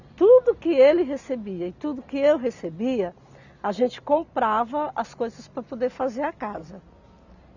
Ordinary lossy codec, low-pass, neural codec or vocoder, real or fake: none; 7.2 kHz; none; real